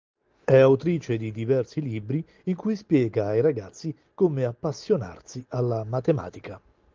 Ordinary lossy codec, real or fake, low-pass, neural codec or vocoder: Opus, 32 kbps; real; 7.2 kHz; none